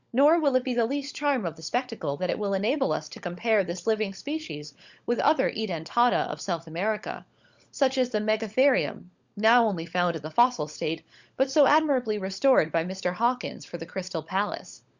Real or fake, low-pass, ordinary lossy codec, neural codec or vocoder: fake; 7.2 kHz; Opus, 64 kbps; codec, 16 kHz, 16 kbps, FunCodec, trained on LibriTTS, 50 frames a second